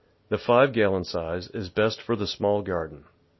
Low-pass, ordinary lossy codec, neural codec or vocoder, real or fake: 7.2 kHz; MP3, 24 kbps; none; real